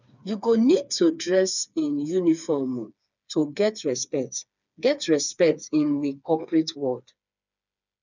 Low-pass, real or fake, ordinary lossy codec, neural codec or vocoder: 7.2 kHz; fake; none; codec, 16 kHz, 4 kbps, FreqCodec, smaller model